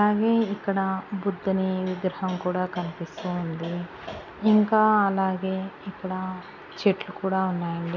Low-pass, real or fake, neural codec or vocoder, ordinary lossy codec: 7.2 kHz; real; none; none